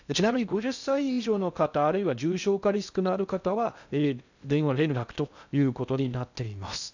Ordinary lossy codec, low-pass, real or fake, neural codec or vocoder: none; 7.2 kHz; fake; codec, 16 kHz in and 24 kHz out, 0.6 kbps, FocalCodec, streaming, 2048 codes